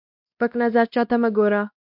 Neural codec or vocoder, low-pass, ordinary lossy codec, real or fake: codec, 16 kHz, 1 kbps, X-Codec, WavLM features, trained on Multilingual LibriSpeech; 5.4 kHz; MP3, 48 kbps; fake